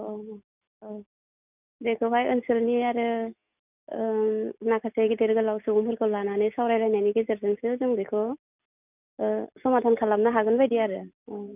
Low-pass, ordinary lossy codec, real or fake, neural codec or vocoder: 3.6 kHz; none; real; none